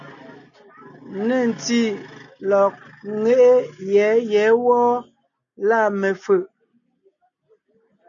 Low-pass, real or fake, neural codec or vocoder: 7.2 kHz; real; none